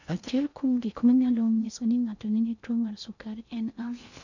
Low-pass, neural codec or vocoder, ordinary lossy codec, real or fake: 7.2 kHz; codec, 16 kHz in and 24 kHz out, 0.6 kbps, FocalCodec, streaming, 2048 codes; none; fake